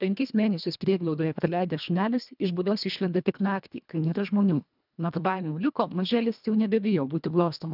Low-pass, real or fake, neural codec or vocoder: 5.4 kHz; fake; codec, 24 kHz, 1.5 kbps, HILCodec